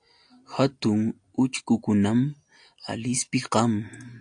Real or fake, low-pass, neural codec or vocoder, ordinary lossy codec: real; 9.9 kHz; none; AAC, 64 kbps